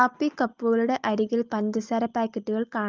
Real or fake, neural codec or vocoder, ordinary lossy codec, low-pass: real; none; Opus, 24 kbps; 7.2 kHz